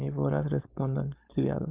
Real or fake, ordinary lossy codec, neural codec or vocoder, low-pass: fake; Opus, 24 kbps; codec, 16 kHz, 4.8 kbps, FACodec; 3.6 kHz